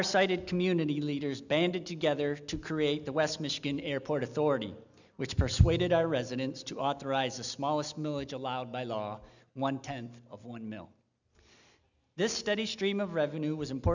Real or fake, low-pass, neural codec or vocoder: real; 7.2 kHz; none